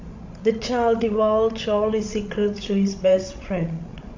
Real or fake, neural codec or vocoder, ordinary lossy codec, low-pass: fake; codec, 16 kHz, 16 kbps, FreqCodec, larger model; AAC, 48 kbps; 7.2 kHz